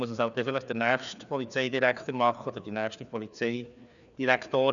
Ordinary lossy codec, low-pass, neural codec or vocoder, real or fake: none; 7.2 kHz; codec, 16 kHz, 2 kbps, FreqCodec, larger model; fake